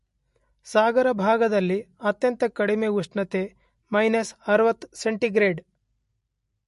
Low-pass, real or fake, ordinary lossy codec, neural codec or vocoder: 14.4 kHz; real; MP3, 48 kbps; none